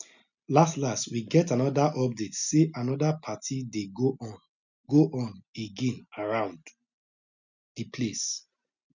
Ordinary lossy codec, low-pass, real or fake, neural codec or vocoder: none; 7.2 kHz; real; none